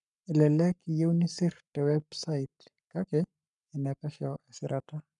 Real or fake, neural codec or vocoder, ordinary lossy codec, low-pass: fake; codec, 44.1 kHz, 7.8 kbps, Pupu-Codec; none; 10.8 kHz